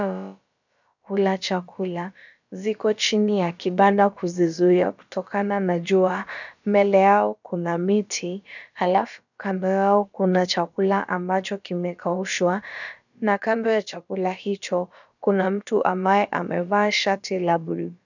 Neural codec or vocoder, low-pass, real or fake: codec, 16 kHz, about 1 kbps, DyCAST, with the encoder's durations; 7.2 kHz; fake